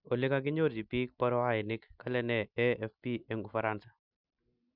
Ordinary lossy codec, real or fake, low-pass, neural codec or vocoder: none; real; 5.4 kHz; none